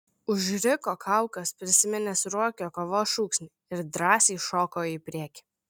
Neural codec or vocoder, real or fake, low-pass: none; real; 19.8 kHz